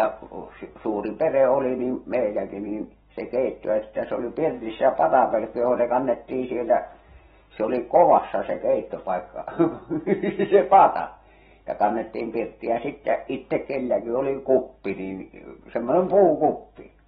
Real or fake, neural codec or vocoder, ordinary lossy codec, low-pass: real; none; AAC, 16 kbps; 19.8 kHz